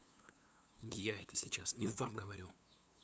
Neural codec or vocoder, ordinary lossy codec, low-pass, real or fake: codec, 16 kHz, 2 kbps, FunCodec, trained on LibriTTS, 25 frames a second; none; none; fake